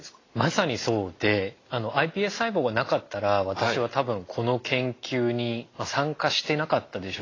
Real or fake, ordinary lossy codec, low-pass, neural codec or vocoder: real; AAC, 32 kbps; 7.2 kHz; none